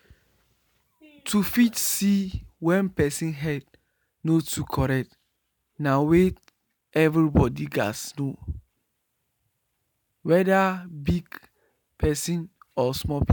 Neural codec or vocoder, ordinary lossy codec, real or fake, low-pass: none; none; real; none